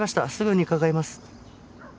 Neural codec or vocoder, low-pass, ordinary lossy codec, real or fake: none; none; none; real